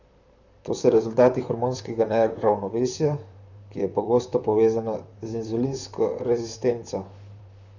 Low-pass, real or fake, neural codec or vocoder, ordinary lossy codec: 7.2 kHz; fake; vocoder, 44.1 kHz, 128 mel bands, Pupu-Vocoder; none